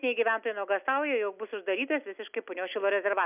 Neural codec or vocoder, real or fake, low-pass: none; real; 3.6 kHz